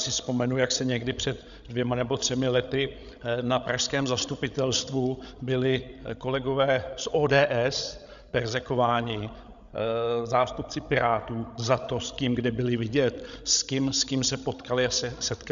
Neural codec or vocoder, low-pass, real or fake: codec, 16 kHz, 16 kbps, FreqCodec, larger model; 7.2 kHz; fake